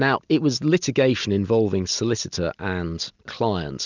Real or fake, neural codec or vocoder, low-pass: real; none; 7.2 kHz